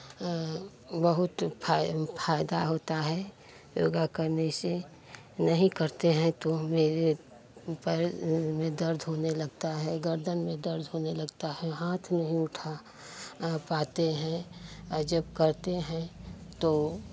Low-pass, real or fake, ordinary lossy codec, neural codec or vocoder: none; real; none; none